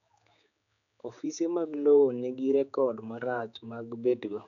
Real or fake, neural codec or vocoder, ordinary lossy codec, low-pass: fake; codec, 16 kHz, 4 kbps, X-Codec, HuBERT features, trained on general audio; none; 7.2 kHz